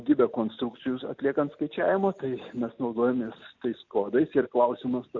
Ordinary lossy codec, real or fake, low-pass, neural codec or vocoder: MP3, 64 kbps; real; 7.2 kHz; none